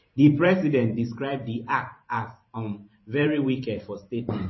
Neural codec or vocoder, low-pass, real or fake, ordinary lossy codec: vocoder, 44.1 kHz, 128 mel bands every 512 samples, BigVGAN v2; 7.2 kHz; fake; MP3, 24 kbps